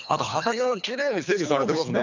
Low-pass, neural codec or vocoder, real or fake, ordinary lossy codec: 7.2 kHz; codec, 24 kHz, 3 kbps, HILCodec; fake; none